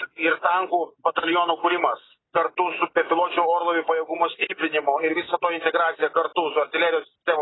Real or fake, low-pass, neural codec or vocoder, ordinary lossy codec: real; 7.2 kHz; none; AAC, 16 kbps